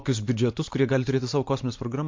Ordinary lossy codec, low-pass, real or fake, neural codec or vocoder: MP3, 48 kbps; 7.2 kHz; fake; vocoder, 44.1 kHz, 128 mel bands every 512 samples, BigVGAN v2